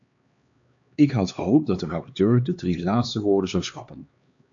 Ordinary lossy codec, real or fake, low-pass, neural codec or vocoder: AAC, 64 kbps; fake; 7.2 kHz; codec, 16 kHz, 2 kbps, X-Codec, HuBERT features, trained on LibriSpeech